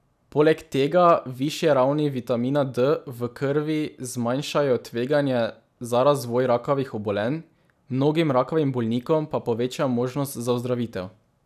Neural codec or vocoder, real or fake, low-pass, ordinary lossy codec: none; real; 14.4 kHz; none